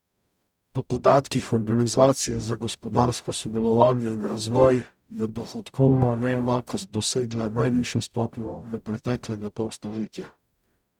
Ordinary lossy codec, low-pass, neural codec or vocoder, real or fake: none; 19.8 kHz; codec, 44.1 kHz, 0.9 kbps, DAC; fake